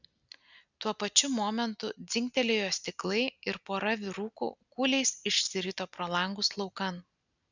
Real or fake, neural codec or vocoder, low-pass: real; none; 7.2 kHz